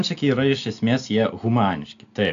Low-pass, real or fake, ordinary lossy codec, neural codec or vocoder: 7.2 kHz; real; AAC, 64 kbps; none